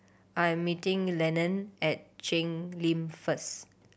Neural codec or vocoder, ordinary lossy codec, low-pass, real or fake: none; none; none; real